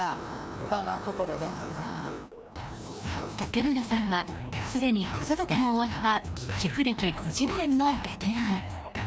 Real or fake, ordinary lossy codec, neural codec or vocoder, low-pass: fake; none; codec, 16 kHz, 1 kbps, FreqCodec, larger model; none